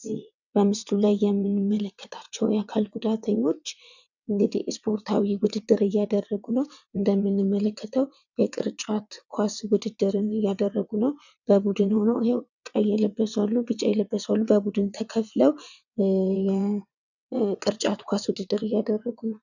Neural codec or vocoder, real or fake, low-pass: vocoder, 22.05 kHz, 80 mel bands, WaveNeXt; fake; 7.2 kHz